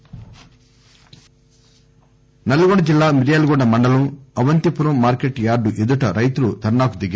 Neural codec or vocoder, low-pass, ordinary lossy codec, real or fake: none; none; none; real